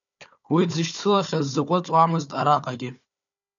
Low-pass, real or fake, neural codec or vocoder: 7.2 kHz; fake; codec, 16 kHz, 4 kbps, FunCodec, trained on Chinese and English, 50 frames a second